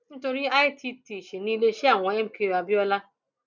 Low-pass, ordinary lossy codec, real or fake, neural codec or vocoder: 7.2 kHz; none; real; none